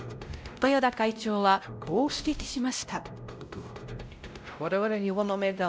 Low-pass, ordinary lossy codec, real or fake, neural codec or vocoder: none; none; fake; codec, 16 kHz, 0.5 kbps, X-Codec, WavLM features, trained on Multilingual LibriSpeech